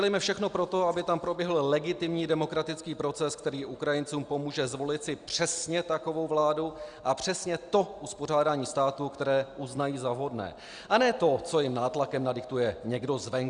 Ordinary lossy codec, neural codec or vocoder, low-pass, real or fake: Opus, 64 kbps; none; 9.9 kHz; real